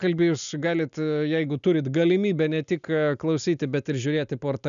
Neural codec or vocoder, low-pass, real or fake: none; 7.2 kHz; real